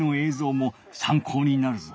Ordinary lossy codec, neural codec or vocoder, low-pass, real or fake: none; none; none; real